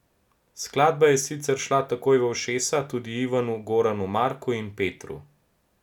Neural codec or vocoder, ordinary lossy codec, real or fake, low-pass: none; none; real; 19.8 kHz